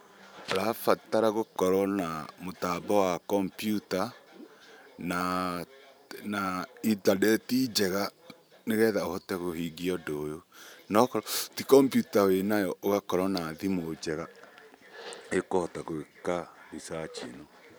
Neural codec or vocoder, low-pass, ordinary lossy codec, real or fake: vocoder, 44.1 kHz, 128 mel bands every 512 samples, BigVGAN v2; none; none; fake